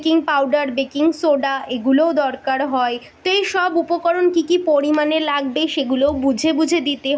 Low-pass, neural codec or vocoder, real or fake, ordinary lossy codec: none; none; real; none